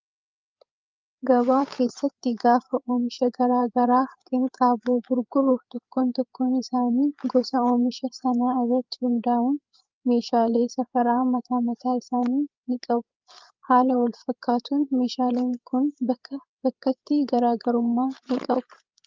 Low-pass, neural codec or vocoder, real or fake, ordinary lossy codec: 7.2 kHz; codec, 16 kHz, 8 kbps, FreqCodec, larger model; fake; Opus, 32 kbps